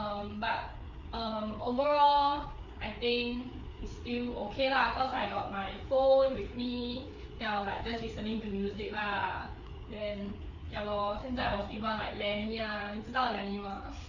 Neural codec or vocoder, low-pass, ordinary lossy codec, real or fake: codec, 16 kHz, 4 kbps, FreqCodec, larger model; 7.2 kHz; none; fake